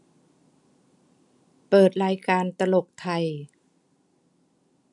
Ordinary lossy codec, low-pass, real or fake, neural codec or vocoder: none; 10.8 kHz; real; none